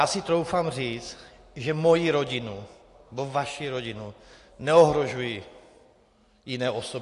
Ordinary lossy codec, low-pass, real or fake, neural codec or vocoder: AAC, 48 kbps; 10.8 kHz; real; none